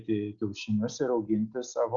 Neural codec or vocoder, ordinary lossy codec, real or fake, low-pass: none; Opus, 64 kbps; real; 7.2 kHz